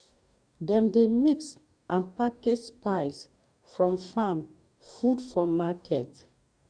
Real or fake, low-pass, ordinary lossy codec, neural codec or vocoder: fake; 9.9 kHz; none; codec, 44.1 kHz, 2.6 kbps, DAC